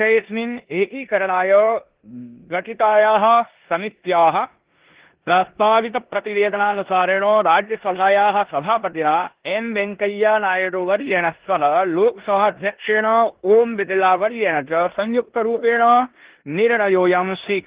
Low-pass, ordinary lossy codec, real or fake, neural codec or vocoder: 3.6 kHz; Opus, 16 kbps; fake; codec, 16 kHz in and 24 kHz out, 0.9 kbps, LongCat-Audio-Codec, four codebook decoder